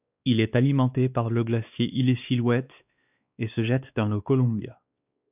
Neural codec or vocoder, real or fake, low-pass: codec, 16 kHz, 2 kbps, X-Codec, WavLM features, trained on Multilingual LibriSpeech; fake; 3.6 kHz